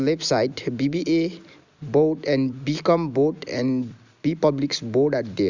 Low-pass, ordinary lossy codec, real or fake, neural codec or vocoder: 7.2 kHz; Opus, 64 kbps; real; none